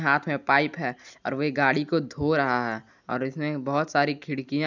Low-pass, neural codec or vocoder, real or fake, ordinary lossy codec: 7.2 kHz; none; real; none